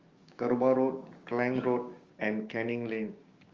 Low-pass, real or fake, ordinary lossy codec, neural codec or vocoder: 7.2 kHz; fake; Opus, 32 kbps; codec, 16 kHz, 6 kbps, DAC